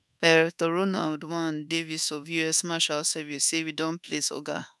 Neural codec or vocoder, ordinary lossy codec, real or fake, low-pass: codec, 24 kHz, 1.2 kbps, DualCodec; none; fake; none